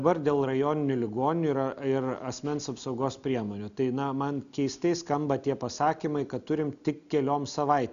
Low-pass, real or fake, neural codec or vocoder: 7.2 kHz; real; none